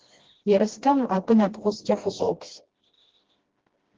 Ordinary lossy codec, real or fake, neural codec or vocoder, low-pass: Opus, 16 kbps; fake; codec, 16 kHz, 1 kbps, FreqCodec, smaller model; 7.2 kHz